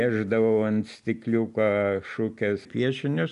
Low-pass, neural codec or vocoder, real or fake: 10.8 kHz; none; real